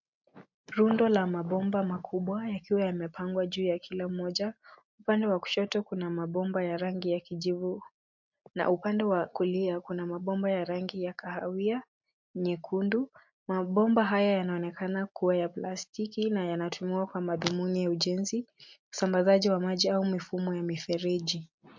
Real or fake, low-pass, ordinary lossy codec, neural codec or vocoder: real; 7.2 kHz; MP3, 48 kbps; none